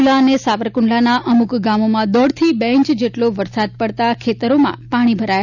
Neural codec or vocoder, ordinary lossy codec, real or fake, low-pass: none; none; real; 7.2 kHz